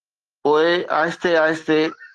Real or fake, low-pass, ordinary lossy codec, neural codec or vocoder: real; 10.8 kHz; Opus, 16 kbps; none